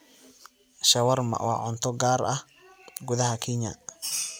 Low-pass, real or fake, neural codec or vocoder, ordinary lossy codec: none; real; none; none